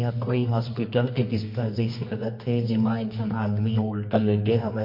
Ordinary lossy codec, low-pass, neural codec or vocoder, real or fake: MP3, 32 kbps; 5.4 kHz; codec, 24 kHz, 0.9 kbps, WavTokenizer, medium music audio release; fake